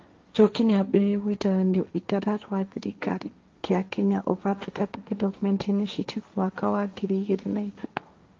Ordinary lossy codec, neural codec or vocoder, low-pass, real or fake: Opus, 32 kbps; codec, 16 kHz, 1.1 kbps, Voila-Tokenizer; 7.2 kHz; fake